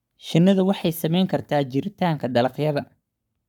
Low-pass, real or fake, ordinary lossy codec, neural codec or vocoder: 19.8 kHz; fake; none; codec, 44.1 kHz, 7.8 kbps, Pupu-Codec